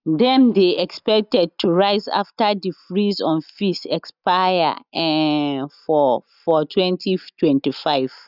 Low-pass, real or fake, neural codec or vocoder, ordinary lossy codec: 5.4 kHz; real; none; none